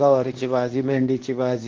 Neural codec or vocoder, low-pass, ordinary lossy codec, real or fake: codec, 24 kHz, 0.9 kbps, WavTokenizer, medium speech release version 2; 7.2 kHz; Opus, 16 kbps; fake